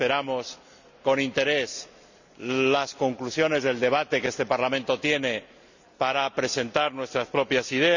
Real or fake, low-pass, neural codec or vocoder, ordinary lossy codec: real; 7.2 kHz; none; none